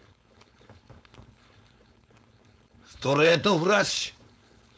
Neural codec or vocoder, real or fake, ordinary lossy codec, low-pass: codec, 16 kHz, 4.8 kbps, FACodec; fake; none; none